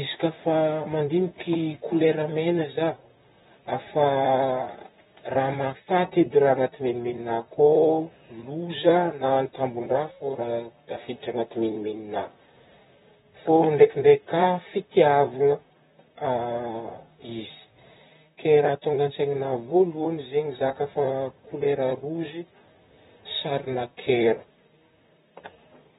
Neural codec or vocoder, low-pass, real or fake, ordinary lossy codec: vocoder, 44.1 kHz, 128 mel bands, Pupu-Vocoder; 19.8 kHz; fake; AAC, 16 kbps